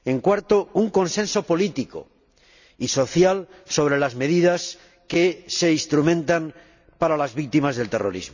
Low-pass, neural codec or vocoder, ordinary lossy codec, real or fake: 7.2 kHz; none; none; real